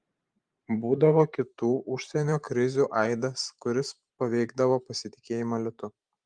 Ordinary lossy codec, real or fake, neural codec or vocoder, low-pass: Opus, 32 kbps; fake; vocoder, 44.1 kHz, 128 mel bands every 512 samples, BigVGAN v2; 9.9 kHz